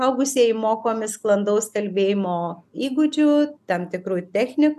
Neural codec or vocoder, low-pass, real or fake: none; 14.4 kHz; real